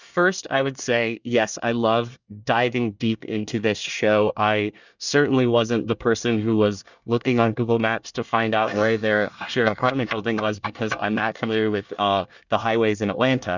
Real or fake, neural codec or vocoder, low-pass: fake; codec, 24 kHz, 1 kbps, SNAC; 7.2 kHz